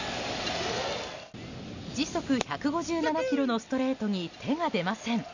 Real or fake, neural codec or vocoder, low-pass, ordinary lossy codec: real; none; 7.2 kHz; none